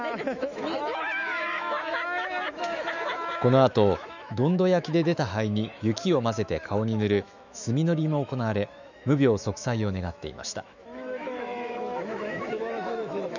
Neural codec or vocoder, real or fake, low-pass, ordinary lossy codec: autoencoder, 48 kHz, 128 numbers a frame, DAC-VAE, trained on Japanese speech; fake; 7.2 kHz; none